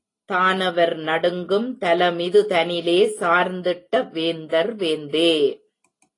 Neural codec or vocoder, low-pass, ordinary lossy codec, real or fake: none; 10.8 kHz; AAC, 32 kbps; real